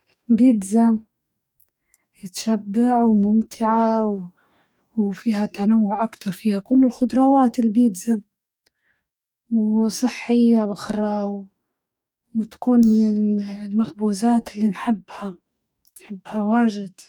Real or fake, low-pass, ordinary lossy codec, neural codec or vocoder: fake; 19.8 kHz; none; codec, 44.1 kHz, 2.6 kbps, DAC